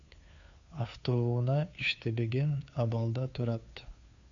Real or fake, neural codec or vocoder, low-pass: fake; codec, 16 kHz, 2 kbps, FunCodec, trained on Chinese and English, 25 frames a second; 7.2 kHz